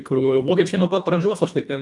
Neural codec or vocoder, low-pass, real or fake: codec, 24 kHz, 1.5 kbps, HILCodec; 10.8 kHz; fake